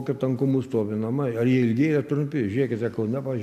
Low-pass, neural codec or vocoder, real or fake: 14.4 kHz; none; real